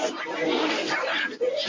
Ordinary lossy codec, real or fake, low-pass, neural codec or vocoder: MP3, 32 kbps; fake; 7.2 kHz; codec, 24 kHz, 0.9 kbps, WavTokenizer, medium speech release version 1